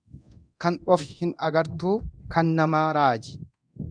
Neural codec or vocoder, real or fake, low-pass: codec, 24 kHz, 0.9 kbps, DualCodec; fake; 9.9 kHz